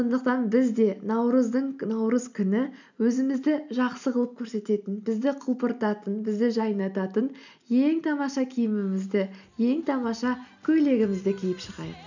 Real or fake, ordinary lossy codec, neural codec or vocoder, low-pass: real; none; none; 7.2 kHz